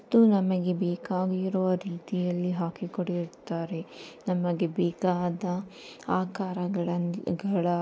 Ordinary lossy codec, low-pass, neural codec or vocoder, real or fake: none; none; none; real